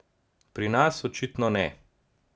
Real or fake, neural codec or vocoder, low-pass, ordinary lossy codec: real; none; none; none